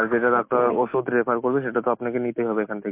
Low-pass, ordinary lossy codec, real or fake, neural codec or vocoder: 3.6 kHz; MP3, 24 kbps; real; none